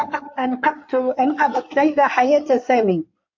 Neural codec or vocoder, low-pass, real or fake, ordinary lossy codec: codec, 16 kHz in and 24 kHz out, 2.2 kbps, FireRedTTS-2 codec; 7.2 kHz; fake; MP3, 48 kbps